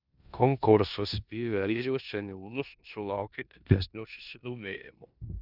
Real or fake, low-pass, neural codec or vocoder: fake; 5.4 kHz; codec, 16 kHz in and 24 kHz out, 0.9 kbps, LongCat-Audio-Codec, four codebook decoder